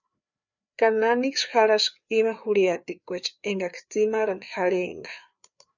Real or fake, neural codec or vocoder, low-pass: fake; codec, 16 kHz, 4 kbps, FreqCodec, larger model; 7.2 kHz